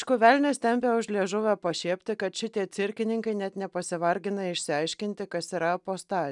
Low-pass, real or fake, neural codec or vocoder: 10.8 kHz; real; none